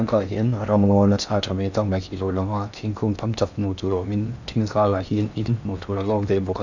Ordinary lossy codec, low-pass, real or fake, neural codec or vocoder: none; 7.2 kHz; fake; codec, 16 kHz in and 24 kHz out, 0.6 kbps, FocalCodec, streaming, 4096 codes